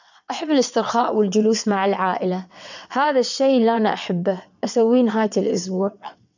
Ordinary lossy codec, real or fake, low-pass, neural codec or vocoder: none; fake; 7.2 kHz; vocoder, 22.05 kHz, 80 mel bands, WaveNeXt